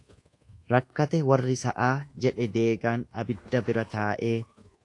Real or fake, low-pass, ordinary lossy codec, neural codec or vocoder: fake; 10.8 kHz; AAC, 48 kbps; codec, 24 kHz, 1.2 kbps, DualCodec